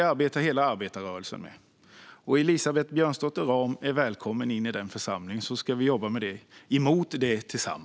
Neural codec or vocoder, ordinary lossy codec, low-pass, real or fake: none; none; none; real